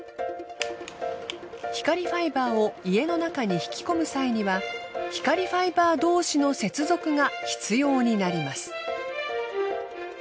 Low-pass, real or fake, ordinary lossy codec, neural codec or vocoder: none; real; none; none